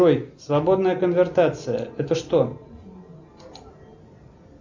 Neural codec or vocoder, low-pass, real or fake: none; 7.2 kHz; real